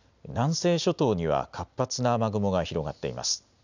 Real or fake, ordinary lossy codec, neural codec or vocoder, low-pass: real; none; none; 7.2 kHz